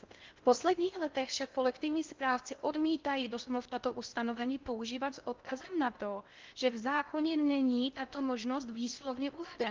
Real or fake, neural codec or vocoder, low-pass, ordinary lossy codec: fake; codec, 16 kHz in and 24 kHz out, 0.6 kbps, FocalCodec, streaming, 4096 codes; 7.2 kHz; Opus, 32 kbps